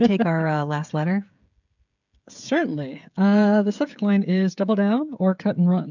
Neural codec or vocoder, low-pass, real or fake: codec, 16 kHz, 16 kbps, FreqCodec, smaller model; 7.2 kHz; fake